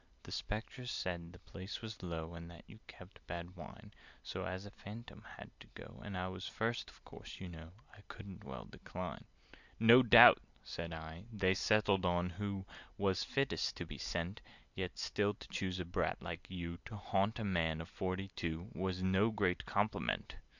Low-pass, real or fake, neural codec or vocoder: 7.2 kHz; real; none